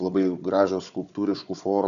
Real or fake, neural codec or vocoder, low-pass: fake; codec, 16 kHz, 4 kbps, FunCodec, trained on Chinese and English, 50 frames a second; 7.2 kHz